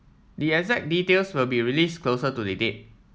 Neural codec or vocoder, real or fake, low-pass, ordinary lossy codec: none; real; none; none